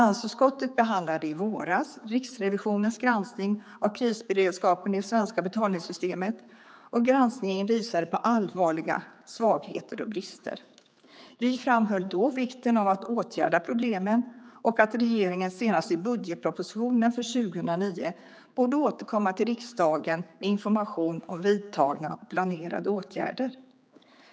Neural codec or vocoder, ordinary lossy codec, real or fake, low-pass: codec, 16 kHz, 4 kbps, X-Codec, HuBERT features, trained on general audio; none; fake; none